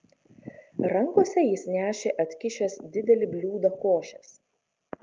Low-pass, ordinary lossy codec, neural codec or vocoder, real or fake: 7.2 kHz; Opus, 24 kbps; none; real